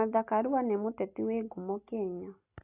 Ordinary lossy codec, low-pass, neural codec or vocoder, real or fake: AAC, 24 kbps; 3.6 kHz; none; real